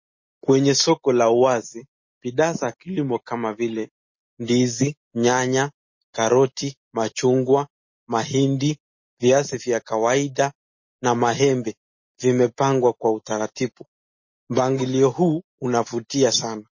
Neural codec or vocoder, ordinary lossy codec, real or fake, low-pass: none; MP3, 32 kbps; real; 7.2 kHz